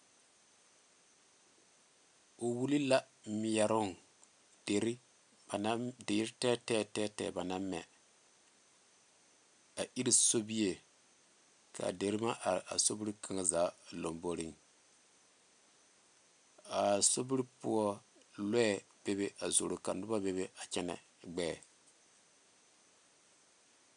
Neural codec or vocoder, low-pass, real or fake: none; 9.9 kHz; real